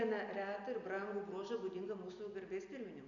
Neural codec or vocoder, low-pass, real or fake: none; 7.2 kHz; real